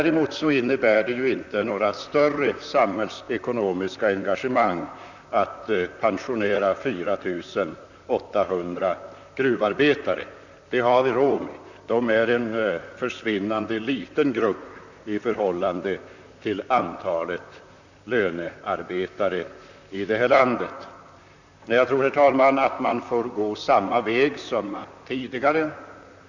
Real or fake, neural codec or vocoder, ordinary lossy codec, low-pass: fake; vocoder, 44.1 kHz, 128 mel bands, Pupu-Vocoder; none; 7.2 kHz